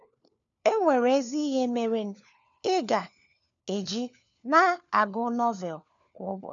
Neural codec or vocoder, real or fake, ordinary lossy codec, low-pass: codec, 16 kHz, 4 kbps, FunCodec, trained on LibriTTS, 50 frames a second; fake; none; 7.2 kHz